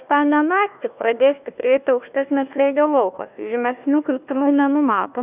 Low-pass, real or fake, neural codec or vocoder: 3.6 kHz; fake; codec, 16 kHz, 1 kbps, FunCodec, trained on Chinese and English, 50 frames a second